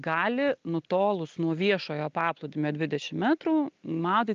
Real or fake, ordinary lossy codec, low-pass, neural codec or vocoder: real; Opus, 24 kbps; 7.2 kHz; none